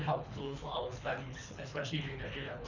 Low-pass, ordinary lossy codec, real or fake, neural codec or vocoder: 7.2 kHz; none; fake; codec, 24 kHz, 3 kbps, HILCodec